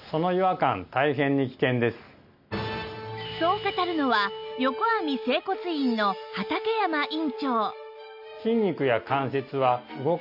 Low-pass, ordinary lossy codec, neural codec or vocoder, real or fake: 5.4 kHz; none; none; real